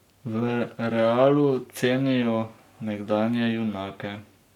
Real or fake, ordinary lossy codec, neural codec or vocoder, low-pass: fake; none; codec, 44.1 kHz, 7.8 kbps, Pupu-Codec; 19.8 kHz